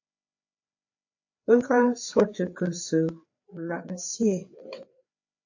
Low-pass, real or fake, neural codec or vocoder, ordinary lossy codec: 7.2 kHz; fake; codec, 16 kHz, 4 kbps, FreqCodec, larger model; AAC, 48 kbps